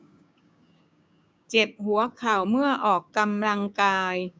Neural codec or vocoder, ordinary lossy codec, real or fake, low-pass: codec, 16 kHz, 6 kbps, DAC; none; fake; none